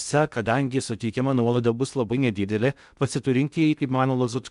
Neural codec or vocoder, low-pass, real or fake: codec, 16 kHz in and 24 kHz out, 0.6 kbps, FocalCodec, streaming, 2048 codes; 10.8 kHz; fake